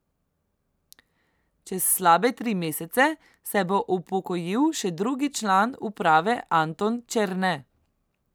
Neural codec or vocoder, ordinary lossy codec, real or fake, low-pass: none; none; real; none